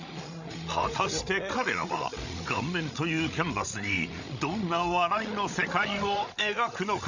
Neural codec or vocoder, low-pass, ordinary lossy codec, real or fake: codec, 16 kHz, 16 kbps, FreqCodec, larger model; 7.2 kHz; none; fake